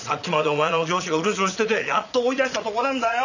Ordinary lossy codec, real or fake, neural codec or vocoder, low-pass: none; fake; vocoder, 22.05 kHz, 80 mel bands, Vocos; 7.2 kHz